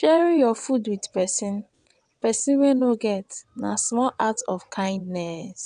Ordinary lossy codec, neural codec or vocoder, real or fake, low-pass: none; vocoder, 22.05 kHz, 80 mel bands, WaveNeXt; fake; 9.9 kHz